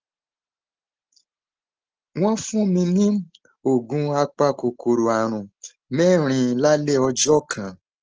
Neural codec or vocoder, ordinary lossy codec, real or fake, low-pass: none; Opus, 16 kbps; real; 7.2 kHz